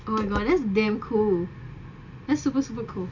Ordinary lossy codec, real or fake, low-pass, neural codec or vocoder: none; real; 7.2 kHz; none